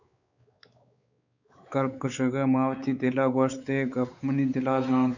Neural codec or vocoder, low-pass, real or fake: codec, 16 kHz, 4 kbps, X-Codec, WavLM features, trained on Multilingual LibriSpeech; 7.2 kHz; fake